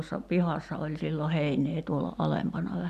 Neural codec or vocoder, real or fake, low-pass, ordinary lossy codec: vocoder, 44.1 kHz, 128 mel bands every 256 samples, BigVGAN v2; fake; 14.4 kHz; none